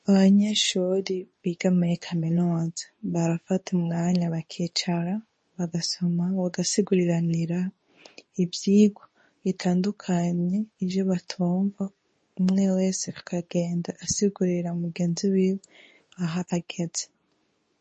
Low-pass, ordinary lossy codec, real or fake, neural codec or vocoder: 10.8 kHz; MP3, 32 kbps; fake; codec, 24 kHz, 0.9 kbps, WavTokenizer, medium speech release version 2